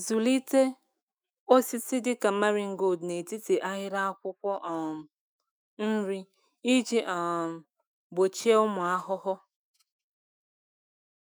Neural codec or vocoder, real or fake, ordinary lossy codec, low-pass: autoencoder, 48 kHz, 128 numbers a frame, DAC-VAE, trained on Japanese speech; fake; none; none